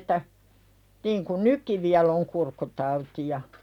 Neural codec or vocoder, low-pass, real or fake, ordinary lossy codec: none; 19.8 kHz; real; none